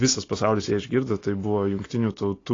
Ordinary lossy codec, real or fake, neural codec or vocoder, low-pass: AAC, 32 kbps; real; none; 7.2 kHz